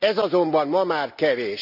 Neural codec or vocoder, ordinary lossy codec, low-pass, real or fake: none; none; 5.4 kHz; real